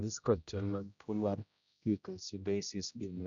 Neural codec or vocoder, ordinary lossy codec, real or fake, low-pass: codec, 16 kHz, 0.5 kbps, X-Codec, HuBERT features, trained on general audio; none; fake; 7.2 kHz